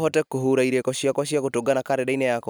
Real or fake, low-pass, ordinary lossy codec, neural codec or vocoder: real; none; none; none